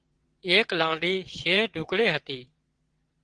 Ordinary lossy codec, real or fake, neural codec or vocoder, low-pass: Opus, 16 kbps; fake; vocoder, 22.05 kHz, 80 mel bands, WaveNeXt; 9.9 kHz